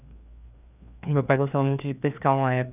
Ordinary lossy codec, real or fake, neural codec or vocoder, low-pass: none; fake; codec, 16 kHz, 2 kbps, FreqCodec, larger model; 3.6 kHz